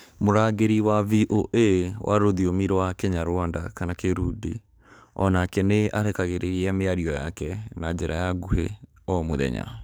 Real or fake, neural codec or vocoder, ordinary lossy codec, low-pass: fake; codec, 44.1 kHz, 7.8 kbps, DAC; none; none